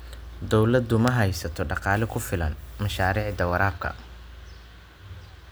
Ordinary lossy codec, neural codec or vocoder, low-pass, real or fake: none; none; none; real